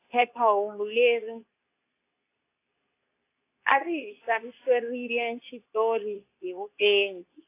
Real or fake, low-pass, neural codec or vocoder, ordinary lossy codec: fake; 3.6 kHz; codec, 24 kHz, 0.9 kbps, WavTokenizer, medium speech release version 2; AAC, 24 kbps